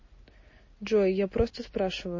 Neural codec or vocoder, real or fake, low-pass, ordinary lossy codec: none; real; 7.2 kHz; MP3, 32 kbps